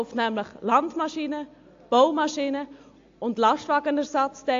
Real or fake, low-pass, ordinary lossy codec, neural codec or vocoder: real; 7.2 kHz; AAC, 48 kbps; none